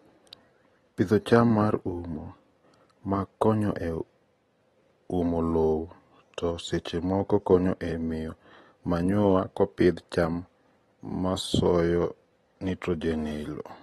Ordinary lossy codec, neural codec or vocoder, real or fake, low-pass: AAC, 32 kbps; none; real; 19.8 kHz